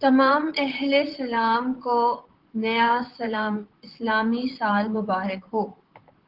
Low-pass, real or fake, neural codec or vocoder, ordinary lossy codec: 5.4 kHz; fake; vocoder, 44.1 kHz, 128 mel bands, Pupu-Vocoder; Opus, 16 kbps